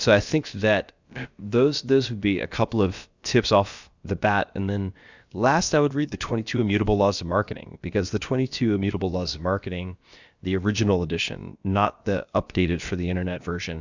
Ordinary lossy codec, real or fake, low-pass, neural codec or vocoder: Opus, 64 kbps; fake; 7.2 kHz; codec, 16 kHz, about 1 kbps, DyCAST, with the encoder's durations